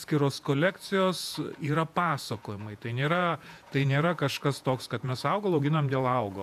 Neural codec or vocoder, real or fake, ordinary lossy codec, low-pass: vocoder, 48 kHz, 128 mel bands, Vocos; fake; AAC, 96 kbps; 14.4 kHz